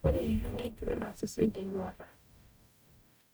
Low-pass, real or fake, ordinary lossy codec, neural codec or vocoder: none; fake; none; codec, 44.1 kHz, 0.9 kbps, DAC